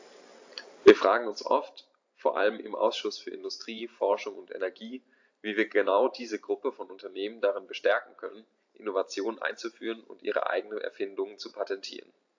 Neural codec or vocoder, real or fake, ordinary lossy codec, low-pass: vocoder, 44.1 kHz, 128 mel bands every 512 samples, BigVGAN v2; fake; AAC, 48 kbps; 7.2 kHz